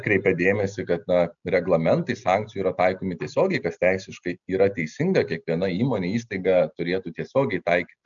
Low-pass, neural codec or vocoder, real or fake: 7.2 kHz; none; real